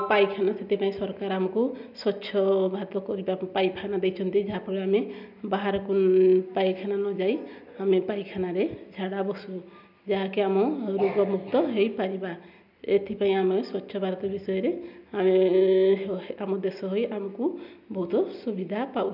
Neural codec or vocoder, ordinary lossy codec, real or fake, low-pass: none; none; real; 5.4 kHz